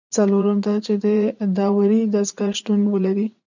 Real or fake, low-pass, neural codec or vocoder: fake; 7.2 kHz; vocoder, 44.1 kHz, 80 mel bands, Vocos